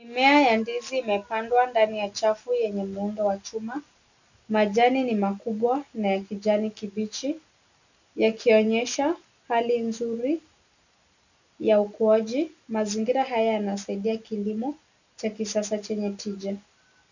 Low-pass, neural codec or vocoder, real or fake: 7.2 kHz; none; real